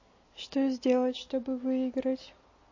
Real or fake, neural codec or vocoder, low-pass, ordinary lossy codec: real; none; 7.2 kHz; MP3, 32 kbps